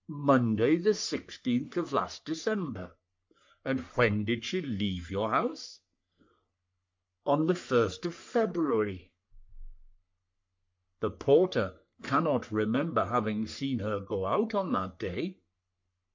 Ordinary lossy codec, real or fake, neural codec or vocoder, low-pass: MP3, 48 kbps; fake; codec, 44.1 kHz, 3.4 kbps, Pupu-Codec; 7.2 kHz